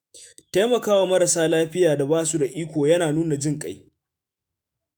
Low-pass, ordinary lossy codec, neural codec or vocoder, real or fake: none; none; autoencoder, 48 kHz, 128 numbers a frame, DAC-VAE, trained on Japanese speech; fake